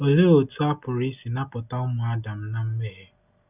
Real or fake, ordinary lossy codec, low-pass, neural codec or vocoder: real; none; 3.6 kHz; none